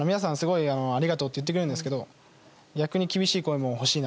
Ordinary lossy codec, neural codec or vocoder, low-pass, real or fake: none; none; none; real